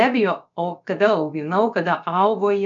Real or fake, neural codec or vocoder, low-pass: fake; codec, 16 kHz, about 1 kbps, DyCAST, with the encoder's durations; 7.2 kHz